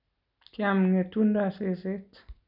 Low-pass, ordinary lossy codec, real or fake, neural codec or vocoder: 5.4 kHz; none; real; none